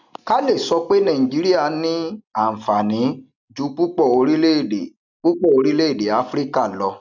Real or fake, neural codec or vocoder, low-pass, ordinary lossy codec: real; none; 7.2 kHz; none